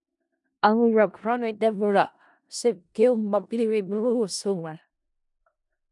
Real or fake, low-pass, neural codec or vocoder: fake; 10.8 kHz; codec, 16 kHz in and 24 kHz out, 0.4 kbps, LongCat-Audio-Codec, four codebook decoder